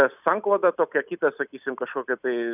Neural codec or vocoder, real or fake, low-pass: none; real; 3.6 kHz